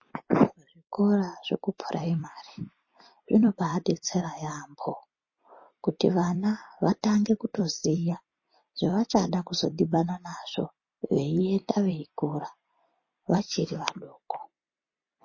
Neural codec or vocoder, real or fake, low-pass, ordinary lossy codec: vocoder, 44.1 kHz, 128 mel bands every 256 samples, BigVGAN v2; fake; 7.2 kHz; MP3, 32 kbps